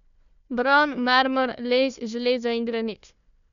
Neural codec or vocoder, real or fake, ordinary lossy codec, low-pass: codec, 16 kHz, 1 kbps, FunCodec, trained on Chinese and English, 50 frames a second; fake; none; 7.2 kHz